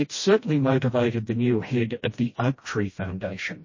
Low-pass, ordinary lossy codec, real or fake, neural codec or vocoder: 7.2 kHz; MP3, 32 kbps; fake; codec, 16 kHz, 1 kbps, FreqCodec, smaller model